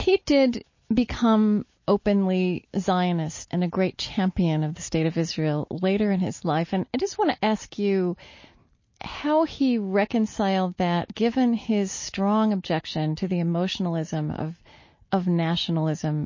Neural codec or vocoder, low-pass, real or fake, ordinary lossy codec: none; 7.2 kHz; real; MP3, 32 kbps